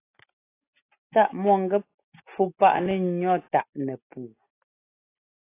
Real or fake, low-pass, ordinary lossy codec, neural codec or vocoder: real; 3.6 kHz; AAC, 24 kbps; none